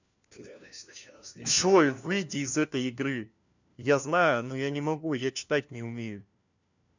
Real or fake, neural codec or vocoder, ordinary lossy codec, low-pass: fake; codec, 16 kHz, 1 kbps, FunCodec, trained on LibriTTS, 50 frames a second; none; 7.2 kHz